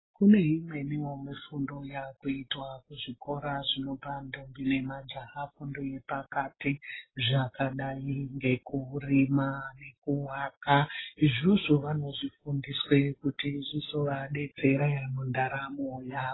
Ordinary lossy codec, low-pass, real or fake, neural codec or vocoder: AAC, 16 kbps; 7.2 kHz; real; none